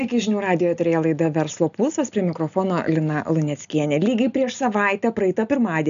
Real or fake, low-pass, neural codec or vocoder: real; 7.2 kHz; none